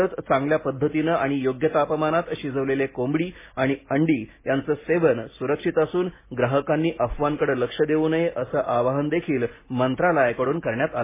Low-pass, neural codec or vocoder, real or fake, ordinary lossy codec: 3.6 kHz; none; real; MP3, 16 kbps